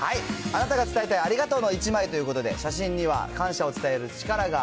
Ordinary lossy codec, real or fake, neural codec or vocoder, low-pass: none; real; none; none